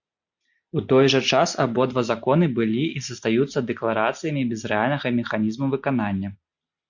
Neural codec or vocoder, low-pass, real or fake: none; 7.2 kHz; real